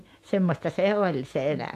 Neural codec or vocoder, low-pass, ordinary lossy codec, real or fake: autoencoder, 48 kHz, 128 numbers a frame, DAC-VAE, trained on Japanese speech; 14.4 kHz; AAC, 64 kbps; fake